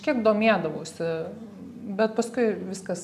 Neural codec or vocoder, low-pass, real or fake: none; 14.4 kHz; real